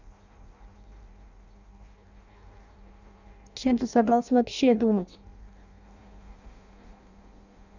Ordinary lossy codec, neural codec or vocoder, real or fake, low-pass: none; codec, 16 kHz in and 24 kHz out, 0.6 kbps, FireRedTTS-2 codec; fake; 7.2 kHz